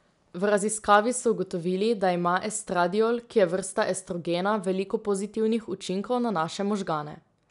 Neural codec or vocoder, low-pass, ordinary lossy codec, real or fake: none; 10.8 kHz; none; real